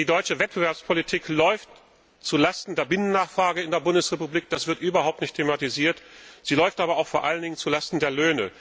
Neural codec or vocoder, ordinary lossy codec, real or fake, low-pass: none; none; real; none